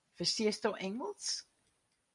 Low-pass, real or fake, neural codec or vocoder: 10.8 kHz; fake; vocoder, 44.1 kHz, 128 mel bands every 256 samples, BigVGAN v2